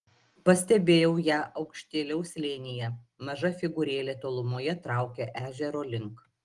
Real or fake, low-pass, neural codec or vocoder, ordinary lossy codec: real; 10.8 kHz; none; Opus, 24 kbps